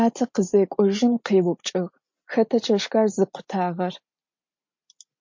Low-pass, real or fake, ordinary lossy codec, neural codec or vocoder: 7.2 kHz; real; MP3, 48 kbps; none